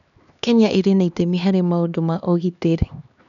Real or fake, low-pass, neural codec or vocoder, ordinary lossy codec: fake; 7.2 kHz; codec, 16 kHz, 2 kbps, X-Codec, HuBERT features, trained on LibriSpeech; none